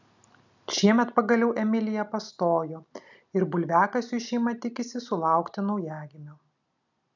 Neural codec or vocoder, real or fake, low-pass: none; real; 7.2 kHz